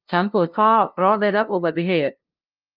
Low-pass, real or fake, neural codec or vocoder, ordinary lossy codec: 5.4 kHz; fake; codec, 16 kHz, 0.5 kbps, FunCodec, trained on LibriTTS, 25 frames a second; Opus, 32 kbps